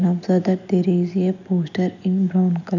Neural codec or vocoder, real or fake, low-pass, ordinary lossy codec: none; real; 7.2 kHz; none